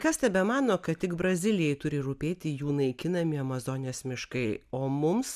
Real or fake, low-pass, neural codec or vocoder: real; 14.4 kHz; none